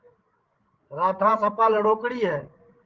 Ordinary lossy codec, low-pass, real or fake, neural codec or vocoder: Opus, 16 kbps; 7.2 kHz; fake; codec, 16 kHz, 16 kbps, FreqCodec, larger model